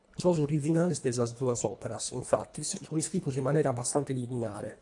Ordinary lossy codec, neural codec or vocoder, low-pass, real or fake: AAC, 64 kbps; codec, 24 kHz, 1.5 kbps, HILCodec; 10.8 kHz; fake